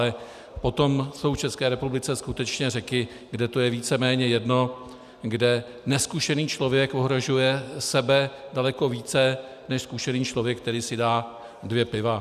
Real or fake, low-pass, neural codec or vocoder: real; 14.4 kHz; none